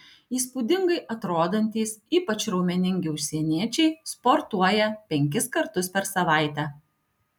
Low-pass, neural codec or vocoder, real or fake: 19.8 kHz; none; real